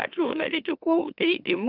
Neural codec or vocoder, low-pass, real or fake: autoencoder, 44.1 kHz, a latent of 192 numbers a frame, MeloTTS; 5.4 kHz; fake